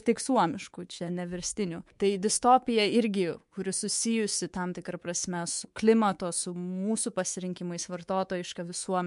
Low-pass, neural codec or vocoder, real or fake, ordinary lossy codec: 10.8 kHz; codec, 24 kHz, 3.1 kbps, DualCodec; fake; MP3, 64 kbps